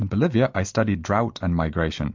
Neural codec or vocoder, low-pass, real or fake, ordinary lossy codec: none; 7.2 kHz; real; MP3, 64 kbps